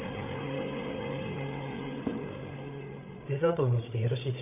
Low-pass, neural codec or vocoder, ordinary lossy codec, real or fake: 3.6 kHz; codec, 16 kHz, 8 kbps, FreqCodec, larger model; none; fake